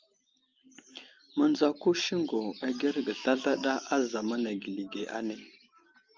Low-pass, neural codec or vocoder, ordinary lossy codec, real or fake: 7.2 kHz; none; Opus, 24 kbps; real